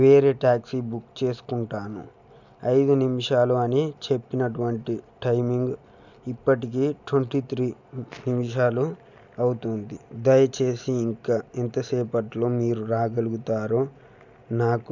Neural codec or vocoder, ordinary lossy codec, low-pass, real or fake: none; none; 7.2 kHz; real